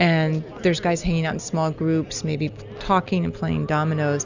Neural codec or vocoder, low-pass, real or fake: none; 7.2 kHz; real